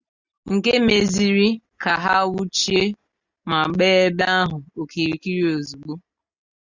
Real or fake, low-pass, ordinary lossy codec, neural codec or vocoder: real; 7.2 kHz; Opus, 64 kbps; none